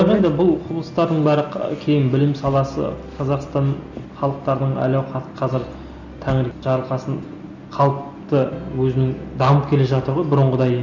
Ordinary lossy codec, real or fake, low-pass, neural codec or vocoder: none; real; 7.2 kHz; none